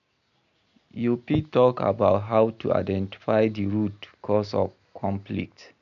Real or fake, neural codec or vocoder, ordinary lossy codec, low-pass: real; none; none; 7.2 kHz